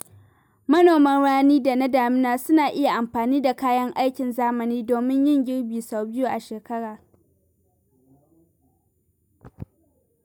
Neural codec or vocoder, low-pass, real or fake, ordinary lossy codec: none; 19.8 kHz; real; none